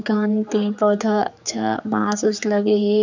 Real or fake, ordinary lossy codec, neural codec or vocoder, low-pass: fake; none; codec, 16 kHz, 4 kbps, X-Codec, HuBERT features, trained on general audio; 7.2 kHz